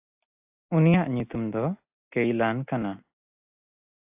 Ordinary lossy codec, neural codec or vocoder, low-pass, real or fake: AAC, 32 kbps; none; 3.6 kHz; real